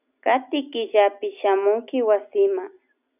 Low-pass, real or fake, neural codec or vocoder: 3.6 kHz; real; none